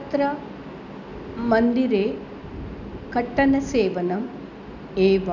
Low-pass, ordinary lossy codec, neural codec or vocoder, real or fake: 7.2 kHz; none; none; real